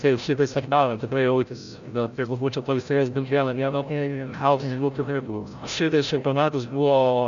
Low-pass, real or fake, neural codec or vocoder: 7.2 kHz; fake; codec, 16 kHz, 0.5 kbps, FreqCodec, larger model